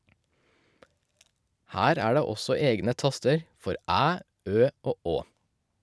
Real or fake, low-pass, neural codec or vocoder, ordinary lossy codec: real; none; none; none